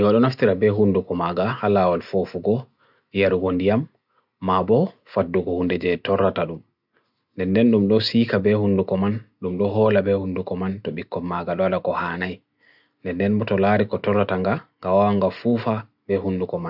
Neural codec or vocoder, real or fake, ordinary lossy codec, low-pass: none; real; none; 5.4 kHz